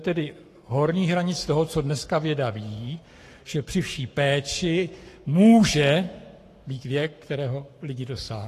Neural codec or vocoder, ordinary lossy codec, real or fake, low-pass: codec, 44.1 kHz, 7.8 kbps, Pupu-Codec; AAC, 48 kbps; fake; 14.4 kHz